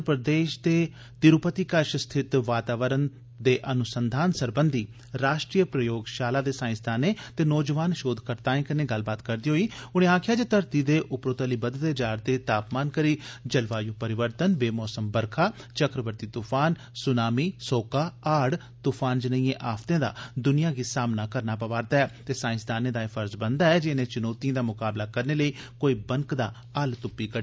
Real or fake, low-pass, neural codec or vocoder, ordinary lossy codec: real; none; none; none